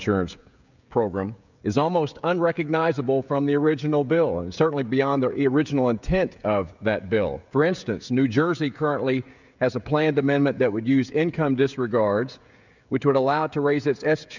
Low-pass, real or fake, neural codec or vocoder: 7.2 kHz; fake; codec, 16 kHz, 16 kbps, FreqCodec, smaller model